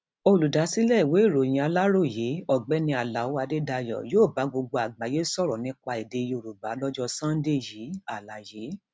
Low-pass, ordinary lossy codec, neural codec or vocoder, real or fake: none; none; none; real